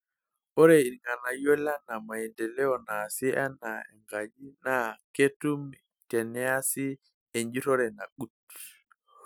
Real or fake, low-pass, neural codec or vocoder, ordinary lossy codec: real; none; none; none